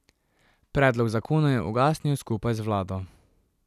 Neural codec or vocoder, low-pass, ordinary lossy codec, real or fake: none; 14.4 kHz; none; real